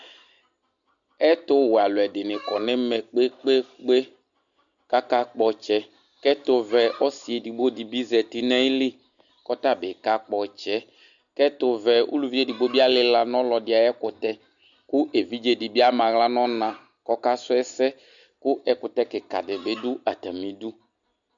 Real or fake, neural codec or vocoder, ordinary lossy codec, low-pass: real; none; MP3, 96 kbps; 7.2 kHz